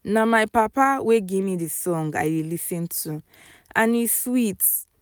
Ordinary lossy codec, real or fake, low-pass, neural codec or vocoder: none; real; none; none